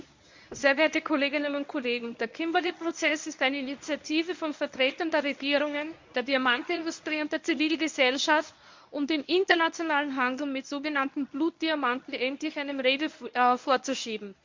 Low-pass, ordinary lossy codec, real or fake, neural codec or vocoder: 7.2 kHz; MP3, 64 kbps; fake; codec, 24 kHz, 0.9 kbps, WavTokenizer, medium speech release version 1